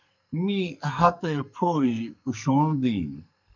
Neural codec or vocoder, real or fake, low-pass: codec, 44.1 kHz, 2.6 kbps, SNAC; fake; 7.2 kHz